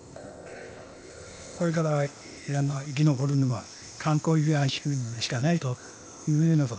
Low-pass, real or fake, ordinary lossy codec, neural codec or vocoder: none; fake; none; codec, 16 kHz, 0.8 kbps, ZipCodec